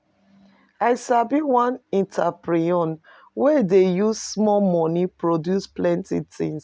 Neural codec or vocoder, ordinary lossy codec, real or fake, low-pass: none; none; real; none